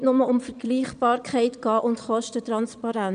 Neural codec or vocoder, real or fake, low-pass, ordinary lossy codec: vocoder, 22.05 kHz, 80 mel bands, Vocos; fake; 9.9 kHz; none